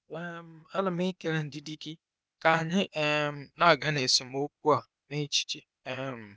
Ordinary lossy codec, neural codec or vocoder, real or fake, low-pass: none; codec, 16 kHz, 0.8 kbps, ZipCodec; fake; none